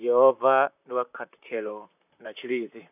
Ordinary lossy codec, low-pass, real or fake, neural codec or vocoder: none; 3.6 kHz; fake; vocoder, 44.1 kHz, 128 mel bands, Pupu-Vocoder